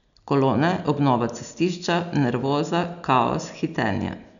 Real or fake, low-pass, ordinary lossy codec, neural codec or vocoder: real; 7.2 kHz; none; none